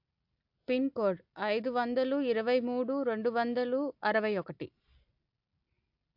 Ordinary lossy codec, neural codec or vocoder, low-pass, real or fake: AAC, 48 kbps; none; 5.4 kHz; real